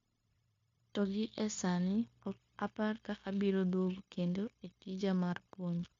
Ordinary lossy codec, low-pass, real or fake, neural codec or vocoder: MP3, 48 kbps; 7.2 kHz; fake; codec, 16 kHz, 0.9 kbps, LongCat-Audio-Codec